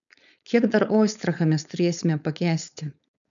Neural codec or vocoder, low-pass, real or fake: codec, 16 kHz, 4.8 kbps, FACodec; 7.2 kHz; fake